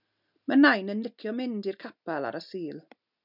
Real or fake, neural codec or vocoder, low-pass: real; none; 5.4 kHz